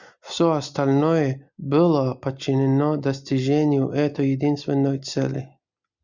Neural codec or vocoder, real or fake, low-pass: none; real; 7.2 kHz